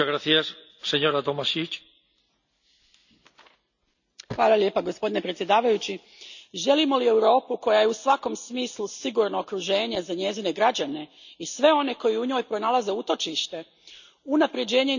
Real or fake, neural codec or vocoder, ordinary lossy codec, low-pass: real; none; none; 7.2 kHz